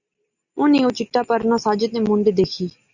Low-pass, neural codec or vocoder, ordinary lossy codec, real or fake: 7.2 kHz; none; Opus, 64 kbps; real